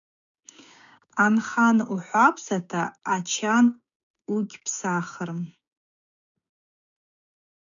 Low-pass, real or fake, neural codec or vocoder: 7.2 kHz; fake; codec, 16 kHz, 6 kbps, DAC